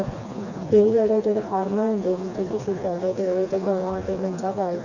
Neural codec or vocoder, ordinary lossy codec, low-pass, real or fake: codec, 16 kHz, 2 kbps, FreqCodec, smaller model; none; 7.2 kHz; fake